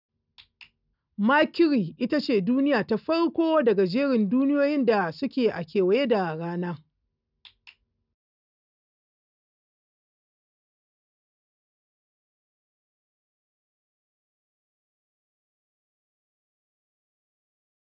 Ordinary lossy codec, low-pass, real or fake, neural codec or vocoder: none; 5.4 kHz; real; none